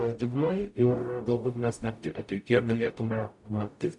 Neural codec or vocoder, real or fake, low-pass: codec, 44.1 kHz, 0.9 kbps, DAC; fake; 10.8 kHz